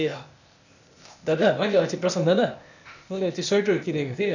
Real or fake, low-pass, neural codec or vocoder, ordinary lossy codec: fake; 7.2 kHz; codec, 16 kHz, 0.8 kbps, ZipCodec; none